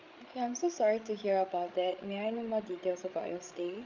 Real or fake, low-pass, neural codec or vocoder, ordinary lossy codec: fake; 7.2 kHz; codec, 16 kHz, 16 kbps, FreqCodec, larger model; Opus, 24 kbps